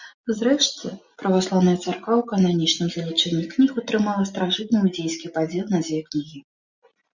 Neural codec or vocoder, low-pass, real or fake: none; 7.2 kHz; real